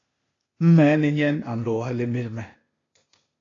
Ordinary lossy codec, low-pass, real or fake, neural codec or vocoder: AAC, 32 kbps; 7.2 kHz; fake; codec, 16 kHz, 0.8 kbps, ZipCodec